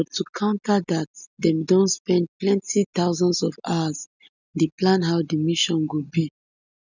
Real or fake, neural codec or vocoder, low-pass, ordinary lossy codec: real; none; 7.2 kHz; none